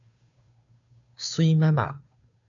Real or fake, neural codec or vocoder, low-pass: fake; codec, 16 kHz, 2 kbps, FunCodec, trained on Chinese and English, 25 frames a second; 7.2 kHz